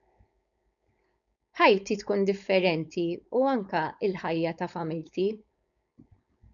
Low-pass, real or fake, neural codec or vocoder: 7.2 kHz; fake; codec, 16 kHz, 4.8 kbps, FACodec